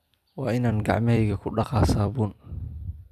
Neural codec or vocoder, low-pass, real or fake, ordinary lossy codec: vocoder, 44.1 kHz, 128 mel bands every 256 samples, BigVGAN v2; 14.4 kHz; fake; none